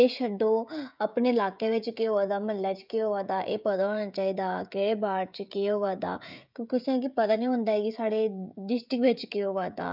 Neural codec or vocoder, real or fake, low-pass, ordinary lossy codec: codec, 16 kHz, 16 kbps, FreqCodec, smaller model; fake; 5.4 kHz; none